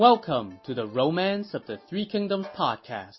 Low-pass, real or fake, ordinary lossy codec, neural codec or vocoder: 7.2 kHz; real; MP3, 24 kbps; none